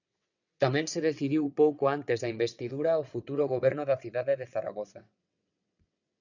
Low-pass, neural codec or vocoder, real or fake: 7.2 kHz; vocoder, 22.05 kHz, 80 mel bands, WaveNeXt; fake